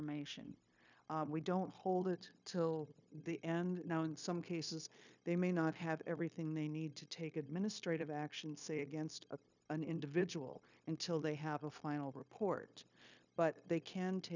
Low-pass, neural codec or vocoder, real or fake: 7.2 kHz; codec, 16 kHz, 0.9 kbps, LongCat-Audio-Codec; fake